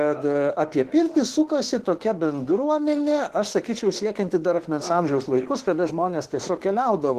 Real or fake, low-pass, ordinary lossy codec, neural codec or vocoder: fake; 14.4 kHz; Opus, 16 kbps; autoencoder, 48 kHz, 32 numbers a frame, DAC-VAE, trained on Japanese speech